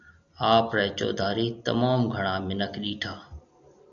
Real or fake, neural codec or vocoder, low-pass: real; none; 7.2 kHz